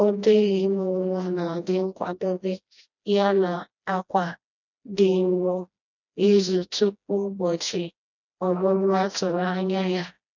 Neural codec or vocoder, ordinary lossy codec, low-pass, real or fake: codec, 16 kHz, 1 kbps, FreqCodec, smaller model; none; 7.2 kHz; fake